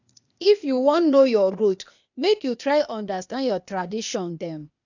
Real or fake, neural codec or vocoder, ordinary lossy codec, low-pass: fake; codec, 16 kHz, 0.8 kbps, ZipCodec; none; 7.2 kHz